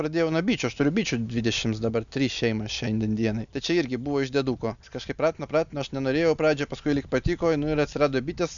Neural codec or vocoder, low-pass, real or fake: none; 7.2 kHz; real